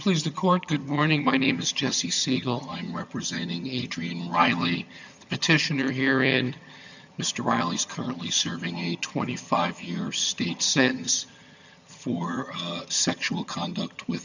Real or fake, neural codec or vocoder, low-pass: fake; vocoder, 22.05 kHz, 80 mel bands, HiFi-GAN; 7.2 kHz